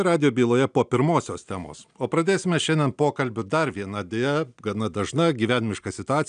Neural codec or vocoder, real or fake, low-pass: none; real; 9.9 kHz